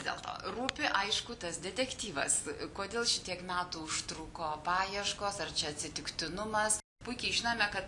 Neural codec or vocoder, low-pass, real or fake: none; 10.8 kHz; real